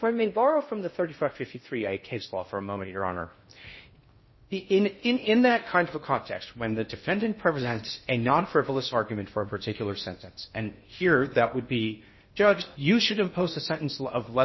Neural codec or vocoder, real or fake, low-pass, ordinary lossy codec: codec, 16 kHz in and 24 kHz out, 0.6 kbps, FocalCodec, streaming, 4096 codes; fake; 7.2 kHz; MP3, 24 kbps